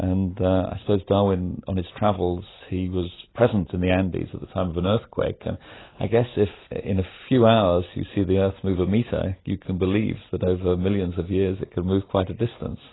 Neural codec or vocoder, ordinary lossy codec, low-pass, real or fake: none; AAC, 16 kbps; 7.2 kHz; real